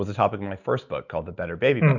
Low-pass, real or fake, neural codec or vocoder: 7.2 kHz; real; none